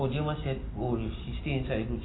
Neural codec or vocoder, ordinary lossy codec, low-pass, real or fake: none; AAC, 16 kbps; 7.2 kHz; real